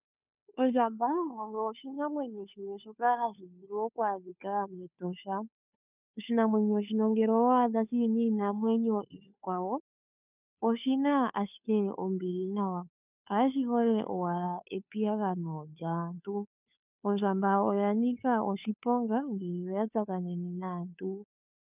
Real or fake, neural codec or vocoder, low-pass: fake; codec, 16 kHz, 2 kbps, FunCodec, trained on Chinese and English, 25 frames a second; 3.6 kHz